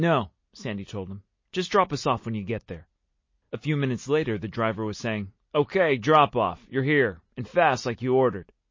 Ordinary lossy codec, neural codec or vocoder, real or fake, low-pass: MP3, 32 kbps; none; real; 7.2 kHz